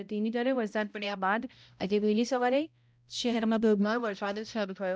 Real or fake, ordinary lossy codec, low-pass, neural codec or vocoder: fake; none; none; codec, 16 kHz, 0.5 kbps, X-Codec, HuBERT features, trained on balanced general audio